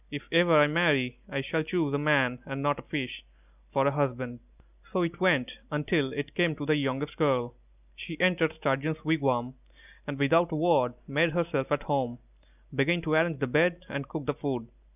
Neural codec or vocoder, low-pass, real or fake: none; 3.6 kHz; real